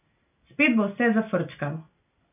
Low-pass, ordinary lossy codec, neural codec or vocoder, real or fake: 3.6 kHz; none; none; real